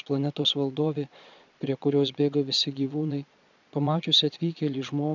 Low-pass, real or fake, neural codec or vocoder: 7.2 kHz; fake; vocoder, 22.05 kHz, 80 mel bands, Vocos